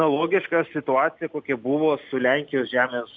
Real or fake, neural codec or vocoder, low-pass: real; none; 7.2 kHz